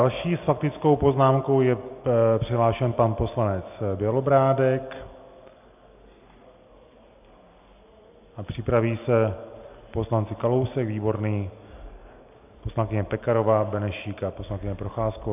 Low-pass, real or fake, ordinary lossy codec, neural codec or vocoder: 3.6 kHz; real; AAC, 32 kbps; none